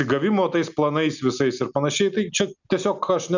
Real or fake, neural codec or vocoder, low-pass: real; none; 7.2 kHz